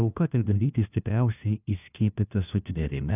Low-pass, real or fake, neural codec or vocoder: 3.6 kHz; fake; codec, 16 kHz, 1 kbps, FunCodec, trained on LibriTTS, 50 frames a second